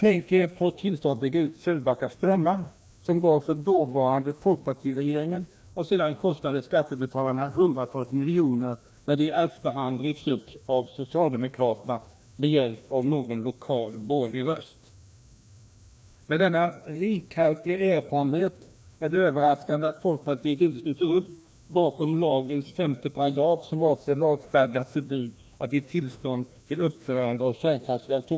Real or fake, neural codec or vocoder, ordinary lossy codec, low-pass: fake; codec, 16 kHz, 1 kbps, FreqCodec, larger model; none; none